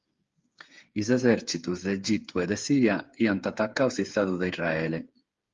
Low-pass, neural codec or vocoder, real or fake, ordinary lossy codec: 7.2 kHz; codec, 16 kHz, 8 kbps, FreqCodec, smaller model; fake; Opus, 32 kbps